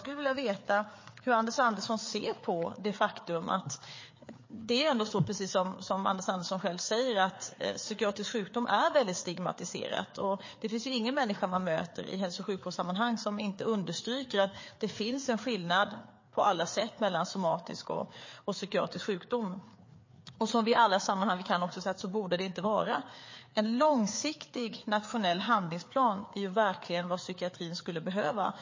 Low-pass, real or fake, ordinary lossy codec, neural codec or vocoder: 7.2 kHz; fake; MP3, 32 kbps; codec, 16 kHz, 4 kbps, FreqCodec, larger model